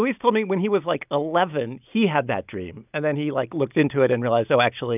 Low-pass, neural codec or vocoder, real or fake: 3.6 kHz; none; real